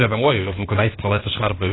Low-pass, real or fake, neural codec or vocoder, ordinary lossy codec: 7.2 kHz; fake; codec, 16 kHz, 0.8 kbps, ZipCodec; AAC, 16 kbps